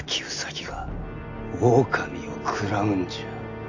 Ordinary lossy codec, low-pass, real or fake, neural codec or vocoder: AAC, 48 kbps; 7.2 kHz; real; none